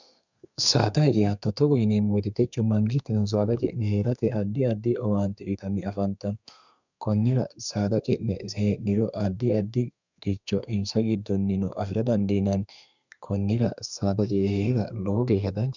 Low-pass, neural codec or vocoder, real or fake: 7.2 kHz; codec, 32 kHz, 1.9 kbps, SNAC; fake